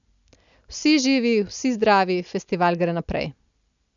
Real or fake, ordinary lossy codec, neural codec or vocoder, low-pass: real; none; none; 7.2 kHz